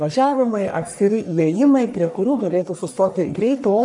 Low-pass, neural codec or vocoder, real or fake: 10.8 kHz; codec, 44.1 kHz, 1.7 kbps, Pupu-Codec; fake